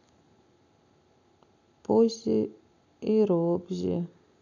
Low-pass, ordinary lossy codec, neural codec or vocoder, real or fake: 7.2 kHz; none; none; real